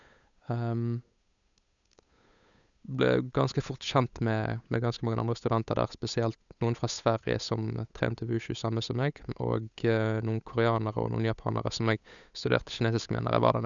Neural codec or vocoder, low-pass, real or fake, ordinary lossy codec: codec, 16 kHz, 8 kbps, FunCodec, trained on Chinese and English, 25 frames a second; 7.2 kHz; fake; none